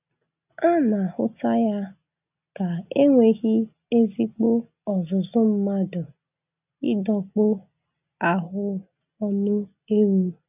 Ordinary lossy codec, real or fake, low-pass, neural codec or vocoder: none; real; 3.6 kHz; none